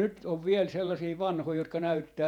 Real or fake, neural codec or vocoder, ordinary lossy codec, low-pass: fake; vocoder, 48 kHz, 128 mel bands, Vocos; none; 19.8 kHz